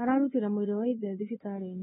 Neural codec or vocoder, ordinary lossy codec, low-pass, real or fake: codec, 16 kHz, 4 kbps, X-Codec, WavLM features, trained on Multilingual LibriSpeech; AAC, 16 kbps; 7.2 kHz; fake